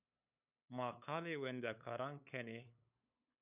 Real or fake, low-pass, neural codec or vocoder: fake; 3.6 kHz; codec, 16 kHz, 8 kbps, FunCodec, trained on LibriTTS, 25 frames a second